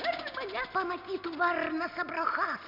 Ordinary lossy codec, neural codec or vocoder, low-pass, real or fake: MP3, 48 kbps; none; 5.4 kHz; real